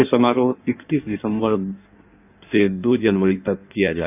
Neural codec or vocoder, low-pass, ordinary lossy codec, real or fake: codec, 16 kHz in and 24 kHz out, 1.1 kbps, FireRedTTS-2 codec; 3.6 kHz; none; fake